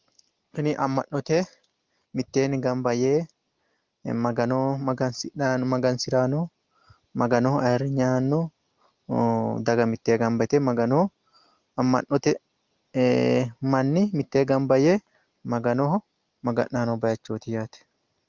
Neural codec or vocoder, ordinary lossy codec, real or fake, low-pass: none; Opus, 32 kbps; real; 7.2 kHz